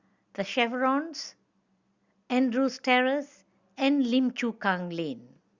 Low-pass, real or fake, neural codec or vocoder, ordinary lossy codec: 7.2 kHz; real; none; Opus, 64 kbps